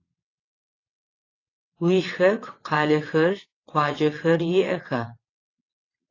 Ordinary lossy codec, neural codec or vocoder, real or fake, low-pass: AAC, 32 kbps; vocoder, 22.05 kHz, 80 mel bands, WaveNeXt; fake; 7.2 kHz